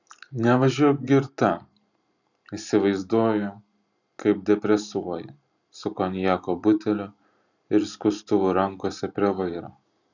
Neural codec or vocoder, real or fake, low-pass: vocoder, 44.1 kHz, 128 mel bands every 512 samples, BigVGAN v2; fake; 7.2 kHz